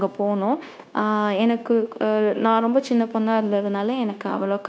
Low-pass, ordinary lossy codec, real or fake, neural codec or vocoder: none; none; fake; codec, 16 kHz, 0.9 kbps, LongCat-Audio-Codec